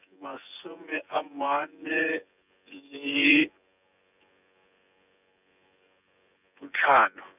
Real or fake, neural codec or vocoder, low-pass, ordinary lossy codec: fake; vocoder, 24 kHz, 100 mel bands, Vocos; 3.6 kHz; none